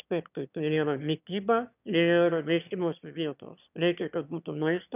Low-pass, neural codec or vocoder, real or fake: 3.6 kHz; autoencoder, 22.05 kHz, a latent of 192 numbers a frame, VITS, trained on one speaker; fake